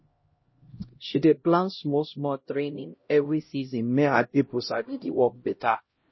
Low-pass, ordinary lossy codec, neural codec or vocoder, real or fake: 7.2 kHz; MP3, 24 kbps; codec, 16 kHz, 0.5 kbps, X-Codec, HuBERT features, trained on LibriSpeech; fake